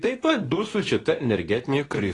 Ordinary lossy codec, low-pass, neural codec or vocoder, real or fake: AAC, 32 kbps; 10.8 kHz; codec, 24 kHz, 0.9 kbps, WavTokenizer, medium speech release version 2; fake